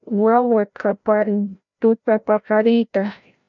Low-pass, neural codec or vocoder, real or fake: 7.2 kHz; codec, 16 kHz, 0.5 kbps, FreqCodec, larger model; fake